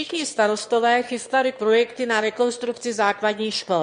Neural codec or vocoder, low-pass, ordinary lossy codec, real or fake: autoencoder, 22.05 kHz, a latent of 192 numbers a frame, VITS, trained on one speaker; 9.9 kHz; MP3, 48 kbps; fake